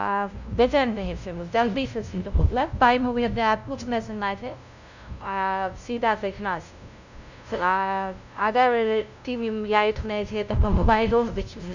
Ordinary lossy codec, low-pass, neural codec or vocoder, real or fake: none; 7.2 kHz; codec, 16 kHz, 0.5 kbps, FunCodec, trained on LibriTTS, 25 frames a second; fake